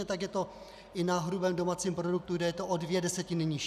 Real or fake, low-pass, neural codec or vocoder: real; 14.4 kHz; none